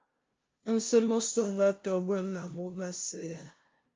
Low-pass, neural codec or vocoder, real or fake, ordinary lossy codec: 7.2 kHz; codec, 16 kHz, 0.5 kbps, FunCodec, trained on LibriTTS, 25 frames a second; fake; Opus, 24 kbps